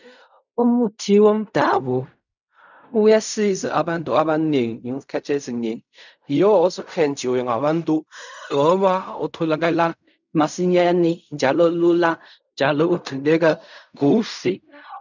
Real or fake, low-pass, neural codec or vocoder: fake; 7.2 kHz; codec, 16 kHz in and 24 kHz out, 0.4 kbps, LongCat-Audio-Codec, fine tuned four codebook decoder